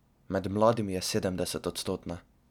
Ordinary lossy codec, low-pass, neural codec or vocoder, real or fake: none; 19.8 kHz; none; real